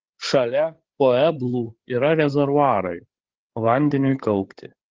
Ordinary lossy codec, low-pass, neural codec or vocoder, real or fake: Opus, 16 kbps; 7.2 kHz; codec, 16 kHz, 8 kbps, FreqCodec, larger model; fake